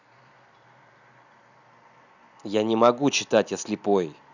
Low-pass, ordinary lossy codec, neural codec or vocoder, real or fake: 7.2 kHz; none; none; real